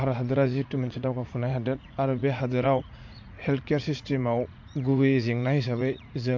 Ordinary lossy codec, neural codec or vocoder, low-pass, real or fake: MP3, 48 kbps; vocoder, 44.1 kHz, 80 mel bands, Vocos; 7.2 kHz; fake